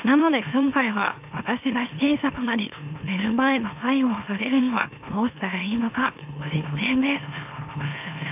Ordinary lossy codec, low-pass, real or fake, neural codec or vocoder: AAC, 32 kbps; 3.6 kHz; fake; autoencoder, 44.1 kHz, a latent of 192 numbers a frame, MeloTTS